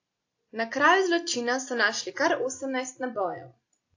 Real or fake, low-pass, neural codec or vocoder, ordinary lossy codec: real; 7.2 kHz; none; AAC, 48 kbps